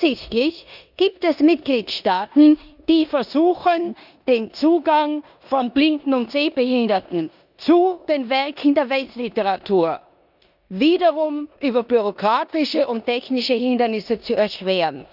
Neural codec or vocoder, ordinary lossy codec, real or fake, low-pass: codec, 16 kHz in and 24 kHz out, 0.9 kbps, LongCat-Audio-Codec, four codebook decoder; none; fake; 5.4 kHz